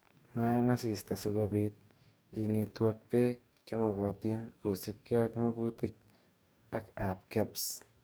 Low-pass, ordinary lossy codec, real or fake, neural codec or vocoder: none; none; fake; codec, 44.1 kHz, 2.6 kbps, DAC